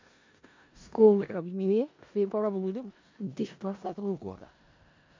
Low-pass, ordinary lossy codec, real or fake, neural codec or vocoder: 7.2 kHz; MP3, 48 kbps; fake; codec, 16 kHz in and 24 kHz out, 0.4 kbps, LongCat-Audio-Codec, four codebook decoder